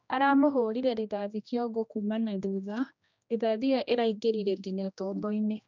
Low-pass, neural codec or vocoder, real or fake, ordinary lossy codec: 7.2 kHz; codec, 16 kHz, 1 kbps, X-Codec, HuBERT features, trained on general audio; fake; none